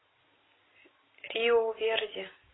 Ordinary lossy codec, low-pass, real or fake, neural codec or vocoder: AAC, 16 kbps; 7.2 kHz; real; none